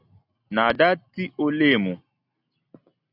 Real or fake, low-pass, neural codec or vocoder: real; 5.4 kHz; none